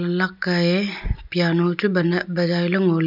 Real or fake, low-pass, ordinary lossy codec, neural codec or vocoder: real; 5.4 kHz; none; none